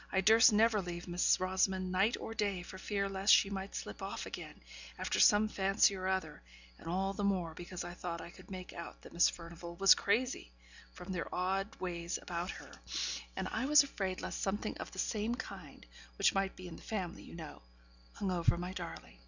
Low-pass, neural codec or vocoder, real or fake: 7.2 kHz; none; real